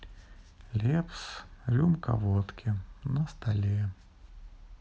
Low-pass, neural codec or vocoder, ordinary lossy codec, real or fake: none; none; none; real